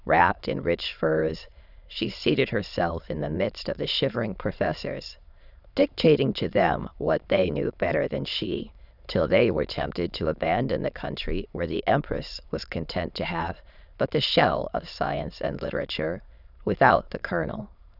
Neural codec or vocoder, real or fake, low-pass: autoencoder, 22.05 kHz, a latent of 192 numbers a frame, VITS, trained on many speakers; fake; 5.4 kHz